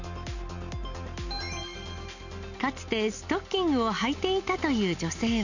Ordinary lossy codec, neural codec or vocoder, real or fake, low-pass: none; none; real; 7.2 kHz